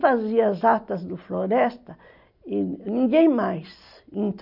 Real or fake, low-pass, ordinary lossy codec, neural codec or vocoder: real; 5.4 kHz; none; none